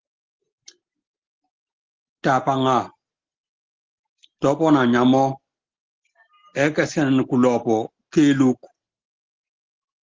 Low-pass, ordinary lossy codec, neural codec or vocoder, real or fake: 7.2 kHz; Opus, 16 kbps; none; real